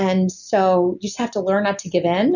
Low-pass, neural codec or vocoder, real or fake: 7.2 kHz; none; real